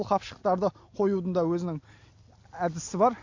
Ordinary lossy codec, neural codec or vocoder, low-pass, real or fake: AAC, 48 kbps; none; 7.2 kHz; real